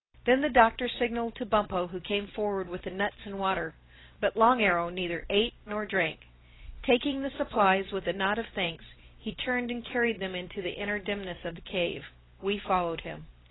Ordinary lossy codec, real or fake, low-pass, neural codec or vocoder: AAC, 16 kbps; real; 7.2 kHz; none